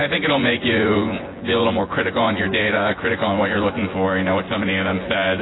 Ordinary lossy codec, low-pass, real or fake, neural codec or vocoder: AAC, 16 kbps; 7.2 kHz; fake; vocoder, 24 kHz, 100 mel bands, Vocos